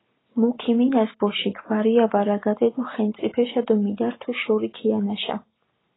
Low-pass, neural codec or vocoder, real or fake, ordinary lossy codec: 7.2 kHz; none; real; AAC, 16 kbps